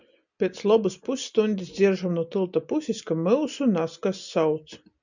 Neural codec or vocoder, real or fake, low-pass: none; real; 7.2 kHz